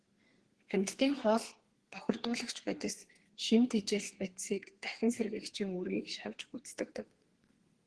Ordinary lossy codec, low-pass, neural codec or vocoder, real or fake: Opus, 16 kbps; 10.8 kHz; codec, 32 kHz, 1.9 kbps, SNAC; fake